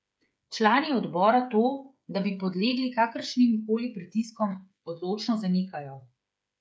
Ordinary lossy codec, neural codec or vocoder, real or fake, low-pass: none; codec, 16 kHz, 8 kbps, FreqCodec, smaller model; fake; none